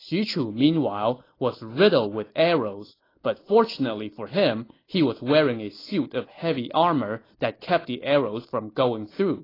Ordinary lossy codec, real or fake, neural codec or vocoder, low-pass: AAC, 24 kbps; real; none; 5.4 kHz